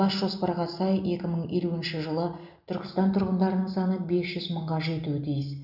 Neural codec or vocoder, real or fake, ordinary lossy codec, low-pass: none; real; none; 5.4 kHz